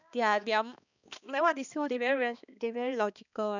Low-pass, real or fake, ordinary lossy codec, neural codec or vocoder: 7.2 kHz; fake; none; codec, 16 kHz, 2 kbps, X-Codec, HuBERT features, trained on balanced general audio